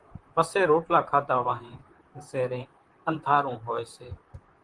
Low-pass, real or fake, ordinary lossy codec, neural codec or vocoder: 10.8 kHz; fake; Opus, 24 kbps; vocoder, 44.1 kHz, 128 mel bands, Pupu-Vocoder